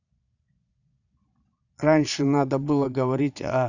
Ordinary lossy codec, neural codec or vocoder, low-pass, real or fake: none; vocoder, 22.05 kHz, 80 mel bands, Vocos; 7.2 kHz; fake